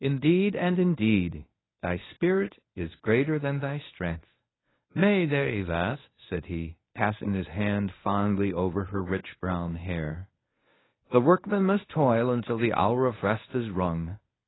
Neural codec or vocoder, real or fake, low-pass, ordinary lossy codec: codec, 24 kHz, 0.9 kbps, WavTokenizer, medium speech release version 1; fake; 7.2 kHz; AAC, 16 kbps